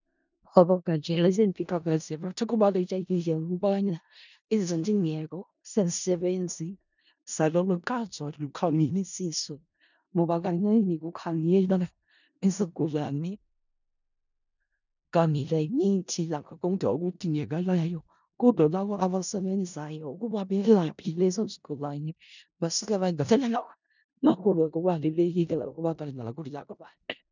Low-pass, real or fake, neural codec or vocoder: 7.2 kHz; fake; codec, 16 kHz in and 24 kHz out, 0.4 kbps, LongCat-Audio-Codec, four codebook decoder